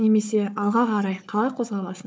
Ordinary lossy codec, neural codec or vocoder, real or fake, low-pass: none; codec, 16 kHz, 4 kbps, FunCodec, trained on Chinese and English, 50 frames a second; fake; none